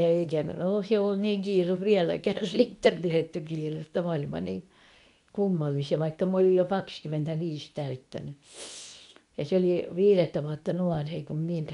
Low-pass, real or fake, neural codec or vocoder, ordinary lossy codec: 10.8 kHz; fake; codec, 24 kHz, 0.9 kbps, WavTokenizer, medium speech release version 2; none